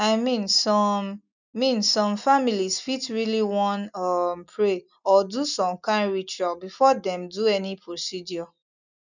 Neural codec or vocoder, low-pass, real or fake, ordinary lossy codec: none; 7.2 kHz; real; none